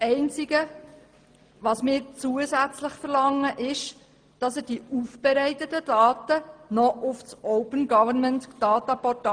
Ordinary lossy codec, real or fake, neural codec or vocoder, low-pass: Opus, 24 kbps; real; none; 9.9 kHz